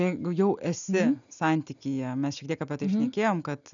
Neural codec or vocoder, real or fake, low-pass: none; real; 7.2 kHz